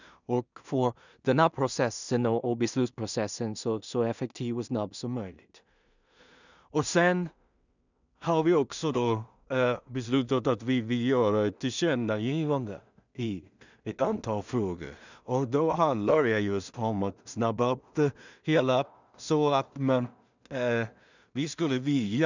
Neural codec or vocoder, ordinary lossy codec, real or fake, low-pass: codec, 16 kHz in and 24 kHz out, 0.4 kbps, LongCat-Audio-Codec, two codebook decoder; none; fake; 7.2 kHz